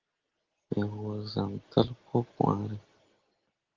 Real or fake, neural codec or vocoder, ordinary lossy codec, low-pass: real; none; Opus, 16 kbps; 7.2 kHz